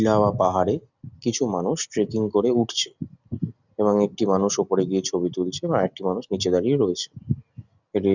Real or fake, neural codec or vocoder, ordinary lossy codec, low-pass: real; none; none; 7.2 kHz